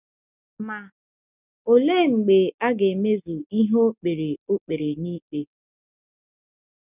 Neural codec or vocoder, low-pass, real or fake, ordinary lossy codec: none; 3.6 kHz; real; none